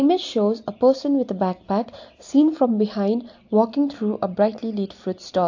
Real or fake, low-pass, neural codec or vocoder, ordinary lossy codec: real; 7.2 kHz; none; AAC, 48 kbps